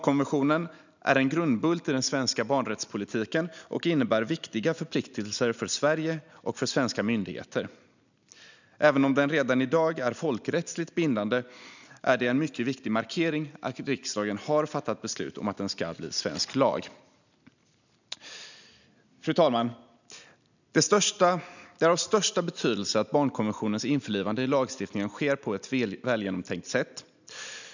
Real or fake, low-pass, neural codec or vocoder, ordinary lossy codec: real; 7.2 kHz; none; none